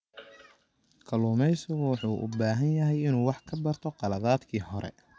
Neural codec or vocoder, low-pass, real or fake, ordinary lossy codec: none; none; real; none